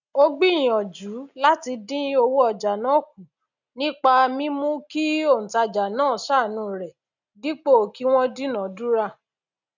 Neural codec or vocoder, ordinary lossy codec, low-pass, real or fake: none; none; 7.2 kHz; real